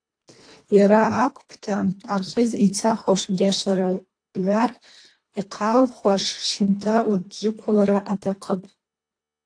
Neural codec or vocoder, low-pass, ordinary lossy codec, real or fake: codec, 24 kHz, 1.5 kbps, HILCodec; 9.9 kHz; AAC, 48 kbps; fake